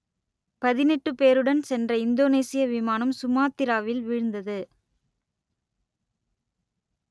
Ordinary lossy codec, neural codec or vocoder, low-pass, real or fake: none; none; none; real